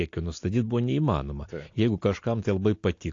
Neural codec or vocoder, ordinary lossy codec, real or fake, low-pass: none; AAC, 48 kbps; real; 7.2 kHz